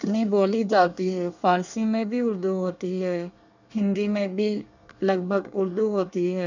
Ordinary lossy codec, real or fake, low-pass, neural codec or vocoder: none; fake; 7.2 kHz; codec, 24 kHz, 1 kbps, SNAC